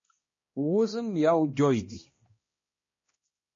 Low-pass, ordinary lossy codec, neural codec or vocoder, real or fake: 7.2 kHz; MP3, 32 kbps; codec, 16 kHz, 1 kbps, X-Codec, HuBERT features, trained on balanced general audio; fake